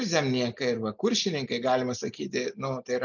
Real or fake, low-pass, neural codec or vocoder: real; 7.2 kHz; none